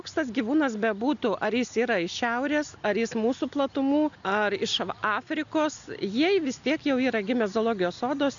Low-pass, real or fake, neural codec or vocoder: 7.2 kHz; real; none